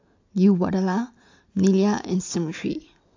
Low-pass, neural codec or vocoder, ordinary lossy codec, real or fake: 7.2 kHz; none; AAC, 48 kbps; real